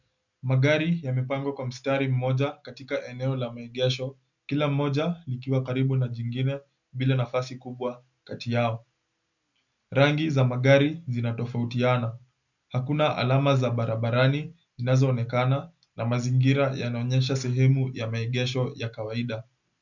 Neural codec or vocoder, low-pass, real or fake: none; 7.2 kHz; real